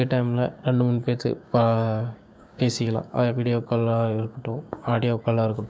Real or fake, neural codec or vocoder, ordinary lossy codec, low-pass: fake; codec, 16 kHz, 6 kbps, DAC; none; none